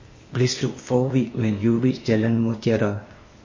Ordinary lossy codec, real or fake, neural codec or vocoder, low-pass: MP3, 32 kbps; fake; codec, 16 kHz, 0.8 kbps, ZipCodec; 7.2 kHz